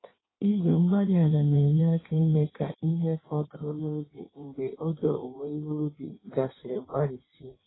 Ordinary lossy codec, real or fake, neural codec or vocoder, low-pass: AAC, 16 kbps; fake; codec, 16 kHz in and 24 kHz out, 1.1 kbps, FireRedTTS-2 codec; 7.2 kHz